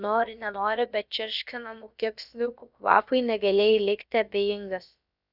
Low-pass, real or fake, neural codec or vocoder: 5.4 kHz; fake; codec, 16 kHz, about 1 kbps, DyCAST, with the encoder's durations